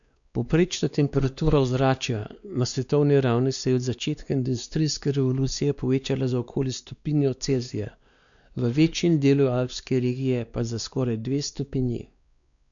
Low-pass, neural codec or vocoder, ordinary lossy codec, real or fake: 7.2 kHz; codec, 16 kHz, 2 kbps, X-Codec, WavLM features, trained on Multilingual LibriSpeech; none; fake